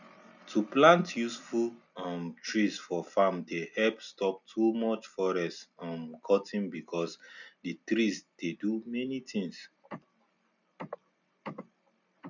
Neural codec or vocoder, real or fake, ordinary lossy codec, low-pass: none; real; none; 7.2 kHz